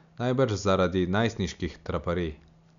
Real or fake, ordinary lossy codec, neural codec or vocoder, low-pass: real; none; none; 7.2 kHz